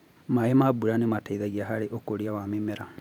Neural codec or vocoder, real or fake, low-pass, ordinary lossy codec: vocoder, 44.1 kHz, 128 mel bands every 512 samples, BigVGAN v2; fake; 19.8 kHz; none